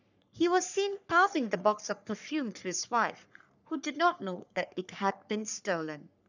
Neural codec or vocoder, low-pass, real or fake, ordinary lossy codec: codec, 44.1 kHz, 3.4 kbps, Pupu-Codec; 7.2 kHz; fake; none